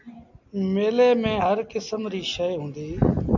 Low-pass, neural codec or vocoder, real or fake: 7.2 kHz; none; real